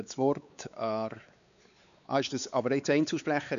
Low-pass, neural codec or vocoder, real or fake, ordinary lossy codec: 7.2 kHz; codec, 16 kHz, 4 kbps, X-Codec, WavLM features, trained on Multilingual LibriSpeech; fake; none